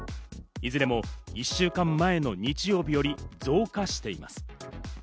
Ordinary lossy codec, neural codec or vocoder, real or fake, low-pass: none; none; real; none